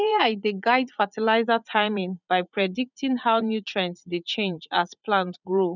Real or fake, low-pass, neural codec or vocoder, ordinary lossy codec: fake; 7.2 kHz; vocoder, 44.1 kHz, 80 mel bands, Vocos; none